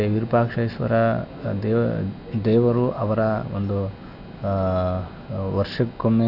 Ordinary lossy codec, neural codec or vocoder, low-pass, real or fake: none; none; 5.4 kHz; real